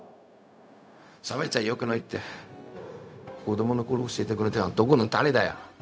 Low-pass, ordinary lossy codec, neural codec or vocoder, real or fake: none; none; codec, 16 kHz, 0.4 kbps, LongCat-Audio-Codec; fake